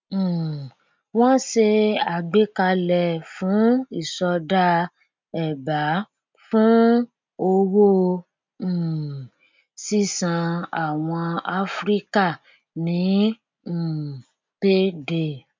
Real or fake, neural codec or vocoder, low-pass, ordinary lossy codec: real; none; 7.2 kHz; MP3, 64 kbps